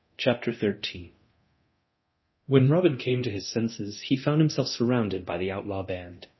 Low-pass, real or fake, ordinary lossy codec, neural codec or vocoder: 7.2 kHz; fake; MP3, 24 kbps; codec, 24 kHz, 0.9 kbps, DualCodec